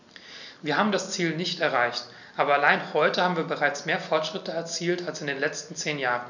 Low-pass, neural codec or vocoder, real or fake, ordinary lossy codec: 7.2 kHz; none; real; none